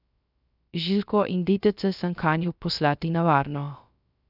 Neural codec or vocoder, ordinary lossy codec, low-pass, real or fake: codec, 16 kHz, 0.3 kbps, FocalCodec; none; 5.4 kHz; fake